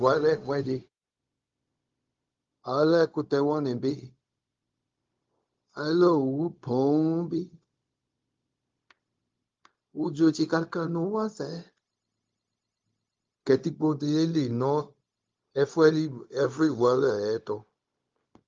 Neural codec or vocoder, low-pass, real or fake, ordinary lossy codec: codec, 16 kHz, 0.4 kbps, LongCat-Audio-Codec; 7.2 kHz; fake; Opus, 32 kbps